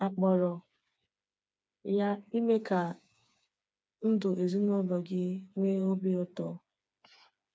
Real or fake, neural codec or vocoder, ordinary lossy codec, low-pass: fake; codec, 16 kHz, 4 kbps, FreqCodec, smaller model; none; none